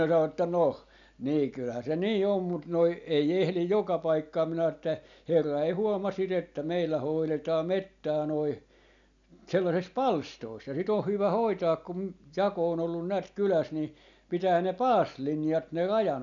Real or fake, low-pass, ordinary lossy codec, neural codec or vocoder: real; 7.2 kHz; none; none